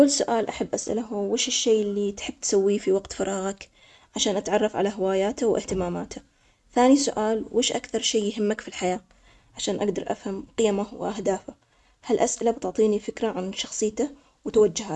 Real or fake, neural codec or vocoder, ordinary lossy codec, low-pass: real; none; none; none